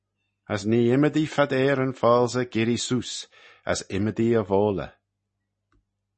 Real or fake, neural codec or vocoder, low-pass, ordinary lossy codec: real; none; 10.8 kHz; MP3, 32 kbps